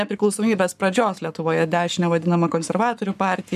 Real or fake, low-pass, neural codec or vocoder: fake; 14.4 kHz; codec, 44.1 kHz, 7.8 kbps, Pupu-Codec